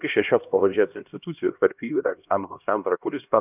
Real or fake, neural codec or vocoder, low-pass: fake; codec, 16 kHz, 1 kbps, X-Codec, HuBERT features, trained on LibriSpeech; 3.6 kHz